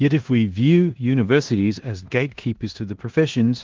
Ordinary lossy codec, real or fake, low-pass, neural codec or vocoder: Opus, 16 kbps; fake; 7.2 kHz; codec, 16 kHz in and 24 kHz out, 0.9 kbps, LongCat-Audio-Codec, four codebook decoder